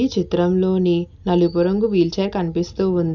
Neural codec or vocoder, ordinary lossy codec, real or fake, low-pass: none; none; real; 7.2 kHz